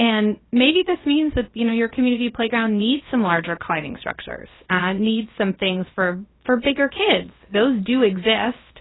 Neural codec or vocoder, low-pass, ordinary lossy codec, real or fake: codec, 16 kHz, about 1 kbps, DyCAST, with the encoder's durations; 7.2 kHz; AAC, 16 kbps; fake